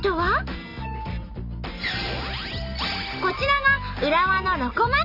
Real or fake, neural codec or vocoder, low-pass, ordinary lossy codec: real; none; 5.4 kHz; none